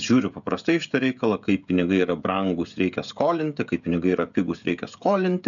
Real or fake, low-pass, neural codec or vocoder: fake; 7.2 kHz; codec, 16 kHz, 16 kbps, FreqCodec, smaller model